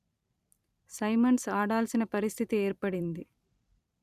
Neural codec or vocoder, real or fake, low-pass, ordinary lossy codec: vocoder, 44.1 kHz, 128 mel bands every 256 samples, BigVGAN v2; fake; 14.4 kHz; Opus, 64 kbps